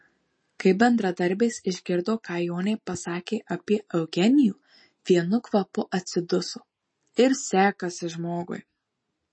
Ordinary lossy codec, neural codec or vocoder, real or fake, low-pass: MP3, 32 kbps; none; real; 9.9 kHz